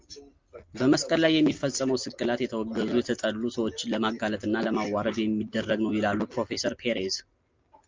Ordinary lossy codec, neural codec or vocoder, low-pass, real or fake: Opus, 24 kbps; none; 7.2 kHz; real